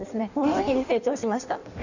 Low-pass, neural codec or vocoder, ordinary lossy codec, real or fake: 7.2 kHz; codec, 16 kHz in and 24 kHz out, 1.1 kbps, FireRedTTS-2 codec; none; fake